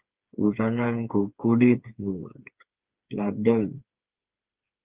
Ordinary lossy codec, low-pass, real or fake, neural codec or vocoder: Opus, 32 kbps; 3.6 kHz; fake; codec, 16 kHz, 4 kbps, FreqCodec, smaller model